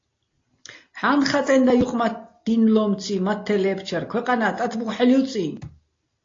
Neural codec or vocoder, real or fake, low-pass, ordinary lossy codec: none; real; 7.2 kHz; AAC, 32 kbps